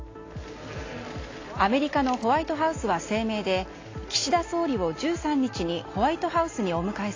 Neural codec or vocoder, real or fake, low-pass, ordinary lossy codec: none; real; 7.2 kHz; AAC, 32 kbps